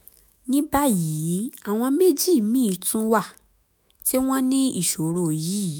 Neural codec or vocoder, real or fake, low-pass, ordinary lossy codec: autoencoder, 48 kHz, 128 numbers a frame, DAC-VAE, trained on Japanese speech; fake; none; none